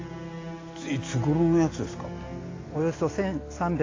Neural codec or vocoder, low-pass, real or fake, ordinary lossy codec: none; 7.2 kHz; real; AAC, 32 kbps